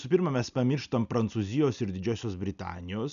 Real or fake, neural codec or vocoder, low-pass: real; none; 7.2 kHz